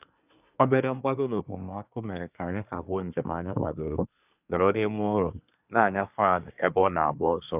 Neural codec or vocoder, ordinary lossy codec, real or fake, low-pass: codec, 24 kHz, 1 kbps, SNAC; none; fake; 3.6 kHz